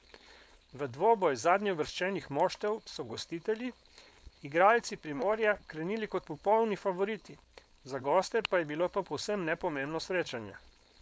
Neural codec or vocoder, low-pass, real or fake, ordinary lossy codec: codec, 16 kHz, 4.8 kbps, FACodec; none; fake; none